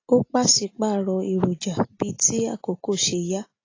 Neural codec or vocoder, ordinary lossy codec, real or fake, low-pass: none; AAC, 32 kbps; real; 7.2 kHz